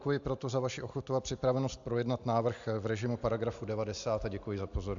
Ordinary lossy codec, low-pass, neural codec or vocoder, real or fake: AAC, 64 kbps; 7.2 kHz; none; real